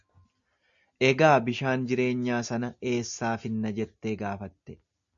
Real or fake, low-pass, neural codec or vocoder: real; 7.2 kHz; none